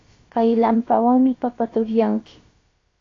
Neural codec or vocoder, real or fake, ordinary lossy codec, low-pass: codec, 16 kHz, about 1 kbps, DyCAST, with the encoder's durations; fake; AAC, 32 kbps; 7.2 kHz